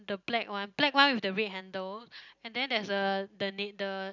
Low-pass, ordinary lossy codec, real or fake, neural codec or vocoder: 7.2 kHz; none; real; none